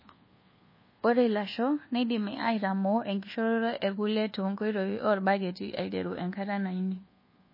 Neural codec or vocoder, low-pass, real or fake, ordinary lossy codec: codec, 24 kHz, 1.2 kbps, DualCodec; 5.4 kHz; fake; MP3, 24 kbps